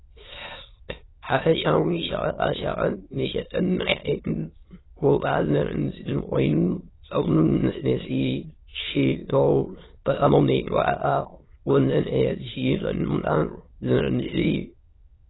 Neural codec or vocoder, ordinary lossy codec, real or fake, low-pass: autoencoder, 22.05 kHz, a latent of 192 numbers a frame, VITS, trained on many speakers; AAC, 16 kbps; fake; 7.2 kHz